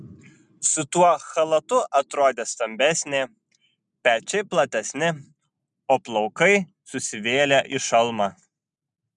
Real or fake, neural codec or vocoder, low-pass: real; none; 10.8 kHz